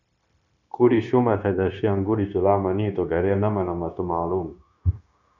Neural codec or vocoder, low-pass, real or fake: codec, 16 kHz, 0.9 kbps, LongCat-Audio-Codec; 7.2 kHz; fake